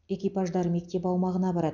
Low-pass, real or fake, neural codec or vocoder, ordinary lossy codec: 7.2 kHz; real; none; none